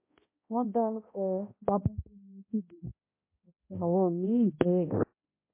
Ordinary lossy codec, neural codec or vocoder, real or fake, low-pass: MP3, 24 kbps; codec, 16 kHz, 0.5 kbps, X-Codec, HuBERT features, trained on balanced general audio; fake; 3.6 kHz